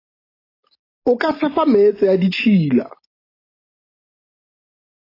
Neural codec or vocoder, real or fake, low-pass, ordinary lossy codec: none; real; 5.4 kHz; AAC, 24 kbps